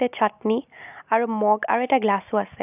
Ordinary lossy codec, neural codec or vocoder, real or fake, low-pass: none; none; real; 3.6 kHz